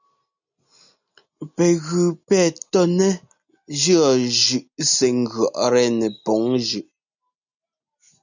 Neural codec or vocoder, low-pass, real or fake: none; 7.2 kHz; real